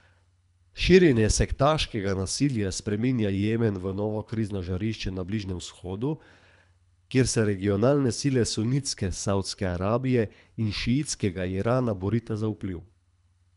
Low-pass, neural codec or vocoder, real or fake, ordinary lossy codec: 10.8 kHz; codec, 24 kHz, 3 kbps, HILCodec; fake; none